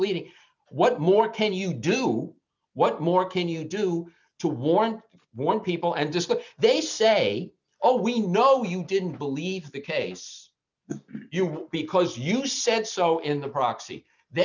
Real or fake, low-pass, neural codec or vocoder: real; 7.2 kHz; none